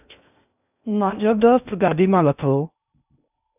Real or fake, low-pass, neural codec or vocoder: fake; 3.6 kHz; codec, 16 kHz in and 24 kHz out, 0.6 kbps, FocalCodec, streaming, 2048 codes